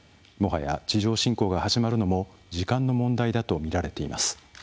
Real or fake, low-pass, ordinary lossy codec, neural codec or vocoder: real; none; none; none